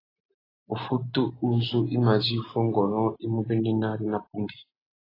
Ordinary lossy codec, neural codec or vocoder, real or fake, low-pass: AAC, 24 kbps; none; real; 5.4 kHz